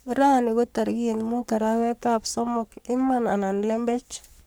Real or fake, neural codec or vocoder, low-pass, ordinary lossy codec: fake; codec, 44.1 kHz, 3.4 kbps, Pupu-Codec; none; none